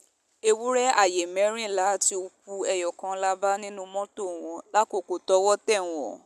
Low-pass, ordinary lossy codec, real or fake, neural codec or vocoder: 14.4 kHz; none; real; none